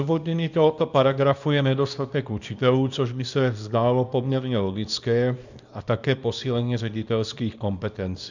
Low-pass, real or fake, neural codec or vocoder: 7.2 kHz; fake; codec, 24 kHz, 0.9 kbps, WavTokenizer, small release